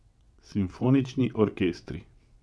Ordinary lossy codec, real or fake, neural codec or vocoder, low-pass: none; fake; vocoder, 22.05 kHz, 80 mel bands, WaveNeXt; none